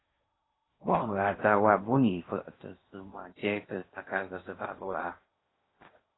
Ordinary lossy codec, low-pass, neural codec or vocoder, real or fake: AAC, 16 kbps; 7.2 kHz; codec, 16 kHz in and 24 kHz out, 0.8 kbps, FocalCodec, streaming, 65536 codes; fake